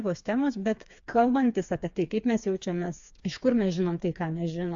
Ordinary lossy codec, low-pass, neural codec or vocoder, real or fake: AAC, 64 kbps; 7.2 kHz; codec, 16 kHz, 4 kbps, FreqCodec, smaller model; fake